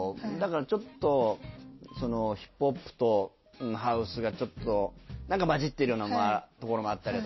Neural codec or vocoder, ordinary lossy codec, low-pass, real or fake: none; MP3, 24 kbps; 7.2 kHz; real